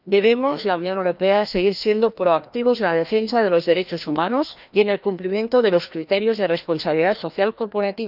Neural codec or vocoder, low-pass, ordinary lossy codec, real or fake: codec, 16 kHz, 1 kbps, FreqCodec, larger model; 5.4 kHz; none; fake